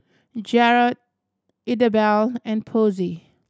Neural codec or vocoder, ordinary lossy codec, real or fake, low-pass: none; none; real; none